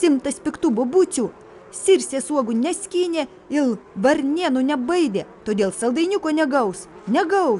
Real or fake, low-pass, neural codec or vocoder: real; 10.8 kHz; none